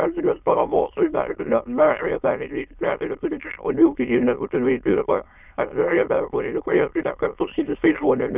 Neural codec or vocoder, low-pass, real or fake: autoencoder, 22.05 kHz, a latent of 192 numbers a frame, VITS, trained on many speakers; 3.6 kHz; fake